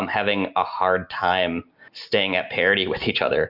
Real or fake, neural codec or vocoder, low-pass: real; none; 5.4 kHz